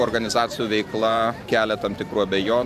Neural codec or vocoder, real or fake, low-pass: vocoder, 44.1 kHz, 128 mel bands every 512 samples, BigVGAN v2; fake; 14.4 kHz